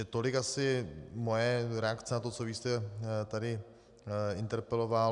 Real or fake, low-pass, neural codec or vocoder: real; 10.8 kHz; none